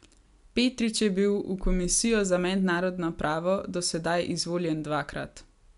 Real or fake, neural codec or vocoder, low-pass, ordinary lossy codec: real; none; 10.8 kHz; none